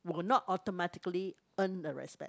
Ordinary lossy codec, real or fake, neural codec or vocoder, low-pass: none; real; none; none